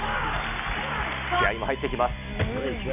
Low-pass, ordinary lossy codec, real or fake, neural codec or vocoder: 3.6 kHz; none; real; none